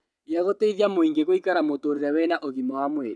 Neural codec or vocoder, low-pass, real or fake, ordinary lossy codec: vocoder, 22.05 kHz, 80 mel bands, WaveNeXt; none; fake; none